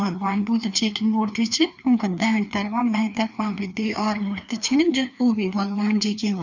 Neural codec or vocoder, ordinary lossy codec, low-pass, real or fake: codec, 16 kHz, 2 kbps, FreqCodec, larger model; none; 7.2 kHz; fake